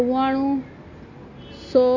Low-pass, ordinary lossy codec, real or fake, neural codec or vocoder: 7.2 kHz; AAC, 48 kbps; real; none